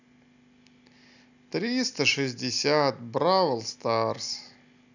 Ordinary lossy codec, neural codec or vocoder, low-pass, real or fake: none; none; 7.2 kHz; real